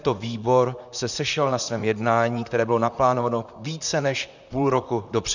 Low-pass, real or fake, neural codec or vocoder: 7.2 kHz; fake; vocoder, 44.1 kHz, 128 mel bands, Pupu-Vocoder